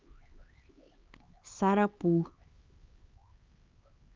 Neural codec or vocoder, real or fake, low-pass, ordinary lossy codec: codec, 16 kHz, 4 kbps, X-Codec, HuBERT features, trained on LibriSpeech; fake; 7.2 kHz; Opus, 24 kbps